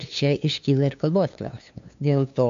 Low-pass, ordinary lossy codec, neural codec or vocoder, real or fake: 7.2 kHz; AAC, 64 kbps; codec, 16 kHz, 2 kbps, FunCodec, trained on LibriTTS, 25 frames a second; fake